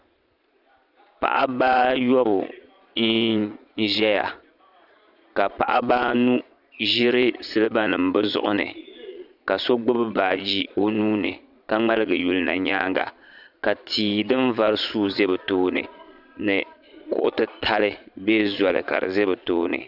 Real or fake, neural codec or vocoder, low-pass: fake; vocoder, 22.05 kHz, 80 mel bands, Vocos; 5.4 kHz